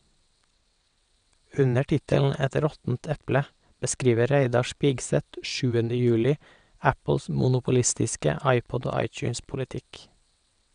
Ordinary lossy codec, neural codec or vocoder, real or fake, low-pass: none; vocoder, 22.05 kHz, 80 mel bands, WaveNeXt; fake; 9.9 kHz